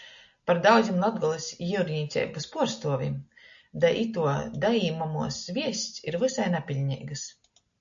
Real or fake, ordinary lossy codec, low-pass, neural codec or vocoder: real; AAC, 64 kbps; 7.2 kHz; none